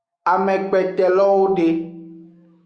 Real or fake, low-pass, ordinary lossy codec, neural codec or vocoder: fake; 9.9 kHz; Opus, 64 kbps; autoencoder, 48 kHz, 128 numbers a frame, DAC-VAE, trained on Japanese speech